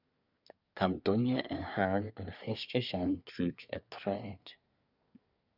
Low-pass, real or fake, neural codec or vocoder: 5.4 kHz; fake; codec, 24 kHz, 1 kbps, SNAC